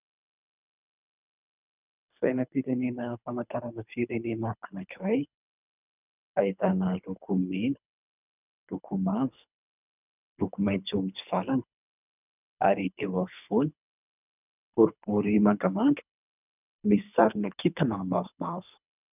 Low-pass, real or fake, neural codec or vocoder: 3.6 kHz; fake; codec, 24 kHz, 3 kbps, HILCodec